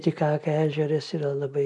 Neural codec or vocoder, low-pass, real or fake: none; 10.8 kHz; real